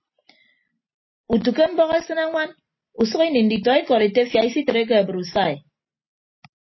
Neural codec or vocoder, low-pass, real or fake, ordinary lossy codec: none; 7.2 kHz; real; MP3, 24 kbps